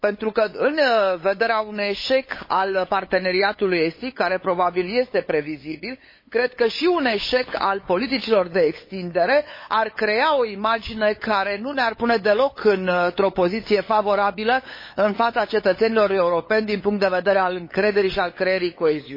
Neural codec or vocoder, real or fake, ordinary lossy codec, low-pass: codec, 16 kHz, 8 kbps, FunCodec, trained on LibriTTS, 25 frames a second; fake; MP3, 24 kbps; 5.4 kHz